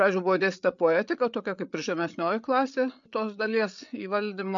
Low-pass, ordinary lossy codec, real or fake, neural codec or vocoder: 7.2 kHz; MP3, 64 kbps; fake; codec, 16 kHz, 8 kbps, FreqCodec, larger model